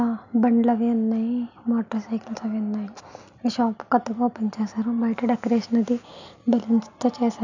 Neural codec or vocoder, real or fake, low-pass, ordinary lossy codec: none; real; 7.2 kHz; none